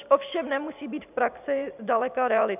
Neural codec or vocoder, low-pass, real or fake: vocoder, 44.1 kHz, 128 mel bands every 512 samples, BigVGAN v2; 3.6 kHz; fake